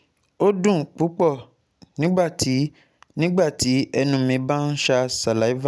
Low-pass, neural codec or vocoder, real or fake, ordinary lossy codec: none; none; real; none